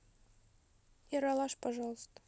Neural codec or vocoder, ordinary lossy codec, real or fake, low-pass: none; none; real; none